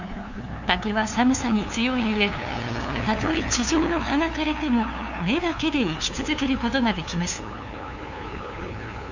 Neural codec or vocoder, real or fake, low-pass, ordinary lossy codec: codec, 16 kHz, 2 kbps, FunCodec, trained on LibriTTS, 25 frames a second; fake; 7.2 kHz; none